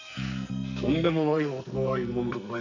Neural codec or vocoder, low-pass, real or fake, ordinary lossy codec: codec, 44.1 kHz, 2.6 kbps, SNAC; 7.2 kHz; fake; none